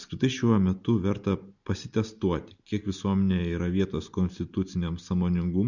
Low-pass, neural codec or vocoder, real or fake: 7.2 kHz; none; real